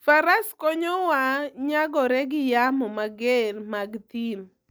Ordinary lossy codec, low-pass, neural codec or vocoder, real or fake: none; none; none; real